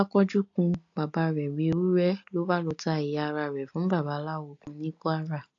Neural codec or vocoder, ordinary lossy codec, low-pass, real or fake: none; AAC, 48 kbps; 7.2 kHz; real